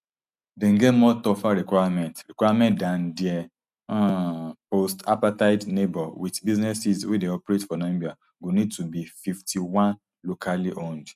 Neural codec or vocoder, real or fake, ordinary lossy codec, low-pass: none; real; none; 14.4 kHz